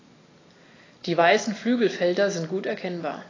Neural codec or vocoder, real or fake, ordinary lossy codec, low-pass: none; real; AAC, 32 kbps; 7.2 kHz